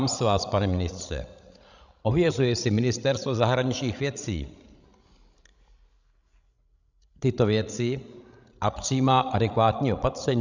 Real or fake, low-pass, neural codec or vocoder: fake; 7.2 kHz; codec, 16 kHz, 16 kbps, FreqCodec, larger model